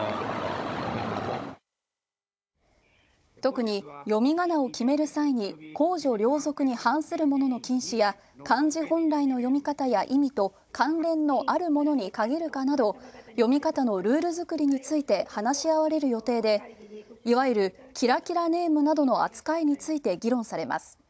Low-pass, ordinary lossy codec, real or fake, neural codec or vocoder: none; none; fake; codec, 16 kHz, 16 kbps, FunCodec, trained on Chinese and English, 50 frames a second